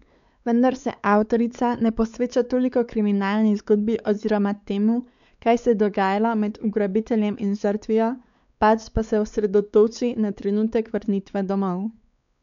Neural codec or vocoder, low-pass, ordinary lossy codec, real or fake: codec, 16 kHz, 4 kbps, X-Codec, WavLM features, trained on Multilingual LibriSpeech; 7.2 kHz; none; fake